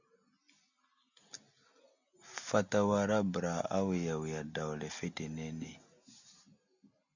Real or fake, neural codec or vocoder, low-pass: real; none; 7.2 kHz